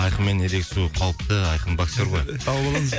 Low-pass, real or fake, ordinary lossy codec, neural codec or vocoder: none; real; none; none